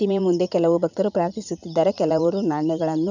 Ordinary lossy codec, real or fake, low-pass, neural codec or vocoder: none; fake; 7.2 kHz; vocoder, 44.1 kHz, 80 mel bands, Vocos